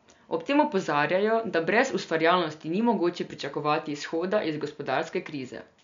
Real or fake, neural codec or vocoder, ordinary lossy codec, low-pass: real; none; MP3, 64 kbps; 7.2 kHz